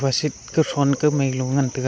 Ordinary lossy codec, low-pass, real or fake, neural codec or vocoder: none; none; real; none